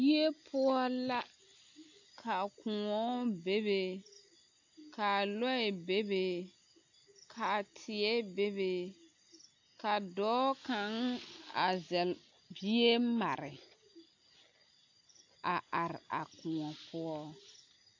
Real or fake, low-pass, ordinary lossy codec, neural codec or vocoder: real; 7.2 kHz; AAC, 48 kbps; none